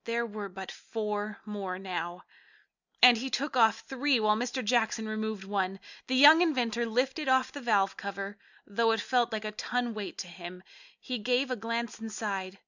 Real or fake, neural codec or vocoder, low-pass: real; none; 7.2 kHz